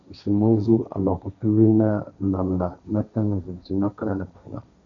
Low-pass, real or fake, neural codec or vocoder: 7.2 kHz; fake; codec, 16 kHz, 1.1 kbps, Voila-Tokenizer